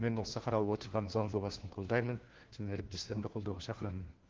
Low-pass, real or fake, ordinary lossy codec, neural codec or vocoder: 7.2 kHz; fake; Opus, 16 kbps; codec, 16 kHz, 1 kbps, FunCodec, trained on LibriTTS, 50 frames a second